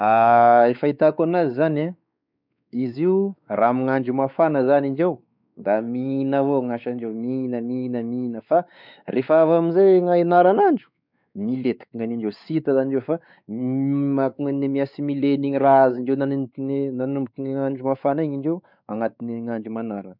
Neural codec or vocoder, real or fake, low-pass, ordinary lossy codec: codec, 16 kHz, 4 kbps, X-Codec, WavLM features, trained on Multilingual LibriSpeech; fake; 5.4 kHz; none